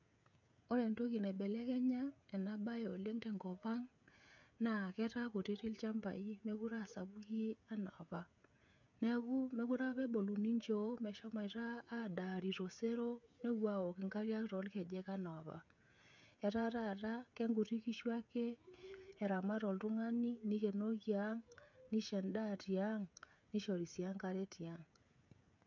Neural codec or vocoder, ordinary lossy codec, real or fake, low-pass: codec, 16 kHz, 16 kbps, FreqCodec, smaller model; none; fake; 7.2 kHz